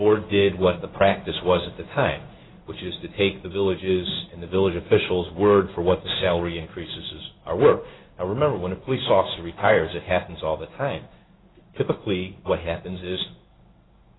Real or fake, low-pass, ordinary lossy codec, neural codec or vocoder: fake; 7.2 kHz; AAC, 16 kbps; codec, 16 kHz in and 24 kHz out, 1 kbps, XY-Tokenizer